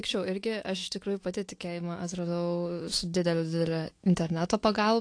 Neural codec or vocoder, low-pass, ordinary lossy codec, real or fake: autoencoder, 48 kHz, 128 numbers a frame, DAC-VAE, trained on Japanese speech; 9.9 kHz; AAC, 48 kbps; fake